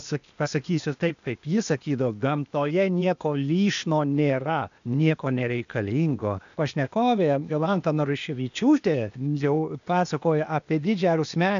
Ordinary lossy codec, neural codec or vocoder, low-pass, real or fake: AAC, 96 kbps; codec, 16 kHz, 0.8 kbps, ZipCodec; 7.2 kHz; fake